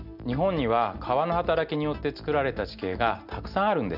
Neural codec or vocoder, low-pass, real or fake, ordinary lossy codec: none; 5.4 kHz; real; none